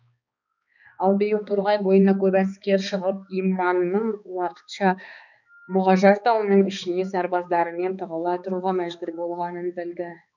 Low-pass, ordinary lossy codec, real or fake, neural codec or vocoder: 7.2 kHz; none; fake; codec, 16 kHz, 2 kbps, X-Codec, HuBERT features, trained on balanced general audio